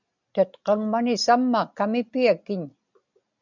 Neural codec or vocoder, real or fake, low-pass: none; real; 7.2 kHz